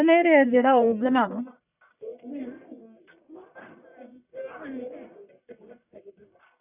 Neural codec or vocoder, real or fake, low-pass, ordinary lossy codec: codec, 44.1 kHz, 1.7 kbps, Pupu-Codec; fake; 3.6 kHz; none